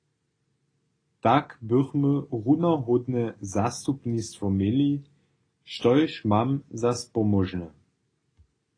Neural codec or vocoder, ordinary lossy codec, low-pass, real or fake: none; AAC, 32 kbps; 9.9 kHz; real